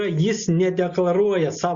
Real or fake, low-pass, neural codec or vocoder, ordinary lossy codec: real; 7.2 kHz; none; Opus, 64 kbps